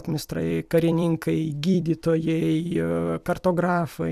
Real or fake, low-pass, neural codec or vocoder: fake; 14.4 kHz; vocoder, 44.1 kHz, 128 mel bands every 256 samples, BigVGAN v2